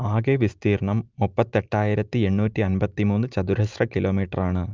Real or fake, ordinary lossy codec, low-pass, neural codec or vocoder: real; Opus, 24 kbps; 7.2 kHz; none